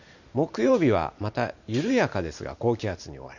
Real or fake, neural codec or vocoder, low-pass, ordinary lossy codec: fake; vocoder, 44.1 kHz, 128 mel bands every 512 samples, BigVGAN v2; 7.2 kHz; none